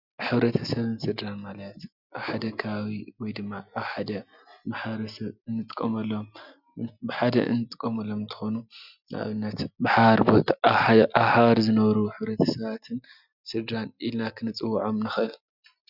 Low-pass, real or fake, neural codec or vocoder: 5.4 kHz; real; none